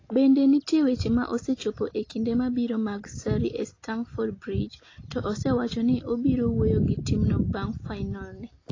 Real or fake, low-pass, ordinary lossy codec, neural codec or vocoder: real; 7.2 kHz; AAC, 32 kbps; none